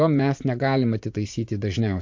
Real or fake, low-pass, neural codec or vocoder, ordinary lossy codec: fake; 7.2 kHz; vocoder, 44.1 kHz, 80 mel bands, Vocos; MP3, 48 kbps